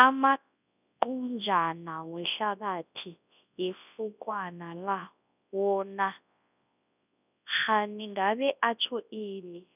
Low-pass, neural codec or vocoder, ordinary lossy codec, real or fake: 3.6 kHz; codec, 24 kHz, 0.9 kbps, WavTokenizer, large speech release; none; fake